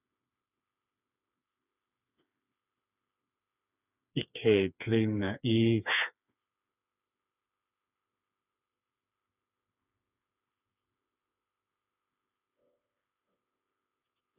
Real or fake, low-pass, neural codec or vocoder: fake; 3.6 kHz; codec, 16 kHz, 4 kbps, FreqCodec, smaller model